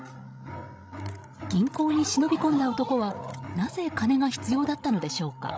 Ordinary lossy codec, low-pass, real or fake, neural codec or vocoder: none; none; fake; codec, 16 kHz, 16 kbps, FreqCodec, larger model